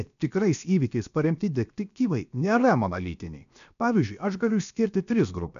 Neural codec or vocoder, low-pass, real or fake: codec, 16 kHz, 0.7 kbps, FocalCodec; 7.2 kHz; fake